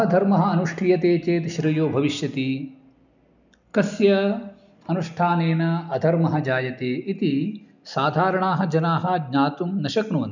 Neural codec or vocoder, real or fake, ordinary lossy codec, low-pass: none; real; none; 7.2 kHz